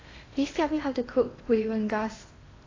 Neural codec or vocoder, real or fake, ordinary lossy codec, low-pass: codec, 16 kHz in and 24 kHz out, 0.8 kbps, FocalCodec, streaming, 65536 codes; fake; AAC, 32 kbps; 7.2 kHz